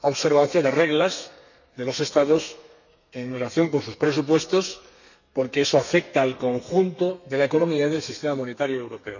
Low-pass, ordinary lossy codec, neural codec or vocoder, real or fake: 7.2 kHz; none; codec, 32 kHz, 1.9 kbps, SNAC; fake